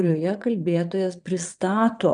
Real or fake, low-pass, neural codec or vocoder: fake; 9.9 kHz; vocoder, 22.05 kHz, 80 mel bands, Vocos